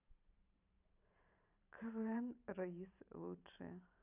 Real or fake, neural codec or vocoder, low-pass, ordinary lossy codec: fake; vocoder, 44.1 kHz, 128 mel bands every 512 samples, BigVGAN v2; 3.6 kHz; none